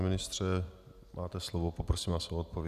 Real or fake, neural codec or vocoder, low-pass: real; none; 14.4 kHz